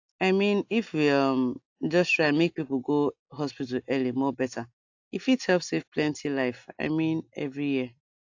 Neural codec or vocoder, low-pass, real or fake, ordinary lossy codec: none; 7.2 kHz; real; AAC, 48 kbps